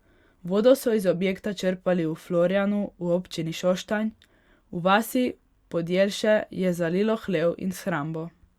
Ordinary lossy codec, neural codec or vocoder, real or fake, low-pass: Opus, 64 kbps; none; real; 19.8 kHz